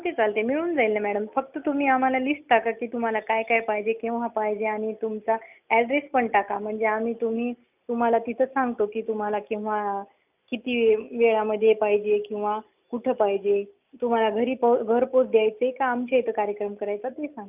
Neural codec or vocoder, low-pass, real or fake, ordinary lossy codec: none; 3.6 kHz; real; none